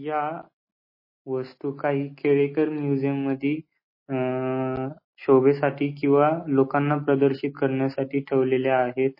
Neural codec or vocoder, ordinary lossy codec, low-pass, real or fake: none; MP3, 24 kbps; 5.4 kHz; real